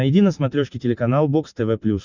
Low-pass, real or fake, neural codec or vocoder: 7.2 kHz; real; none